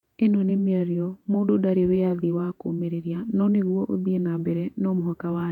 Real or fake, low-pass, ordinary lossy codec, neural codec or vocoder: fake; 19.8 kHz; none; vocoder, 48 kHz, 128 mel bands, Vocos